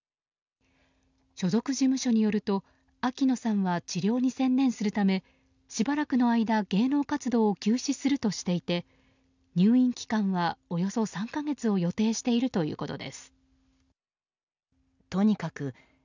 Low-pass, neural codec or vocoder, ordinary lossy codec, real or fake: 7.2 kHz; none; none; real